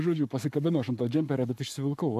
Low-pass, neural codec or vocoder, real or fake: 14.4 kHz; codec, 44.1 kHz, 7.8 kbps, Pupu-Codec; fake